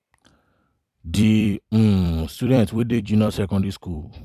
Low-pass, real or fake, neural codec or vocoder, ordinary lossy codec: 14.4 kHz; fake; vocoder, 44.1 kHz, 128 mel bands every 256 samples, BigVGAN v2; none